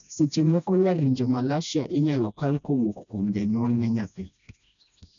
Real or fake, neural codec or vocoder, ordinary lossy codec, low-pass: fake; codec, 16 kHz, 1 kbps, FreqCodec, smaller model; none; 7.2 kHz